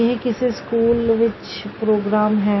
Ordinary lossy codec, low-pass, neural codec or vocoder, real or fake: MP3, 24 kbps; 7.2 kHz; none; real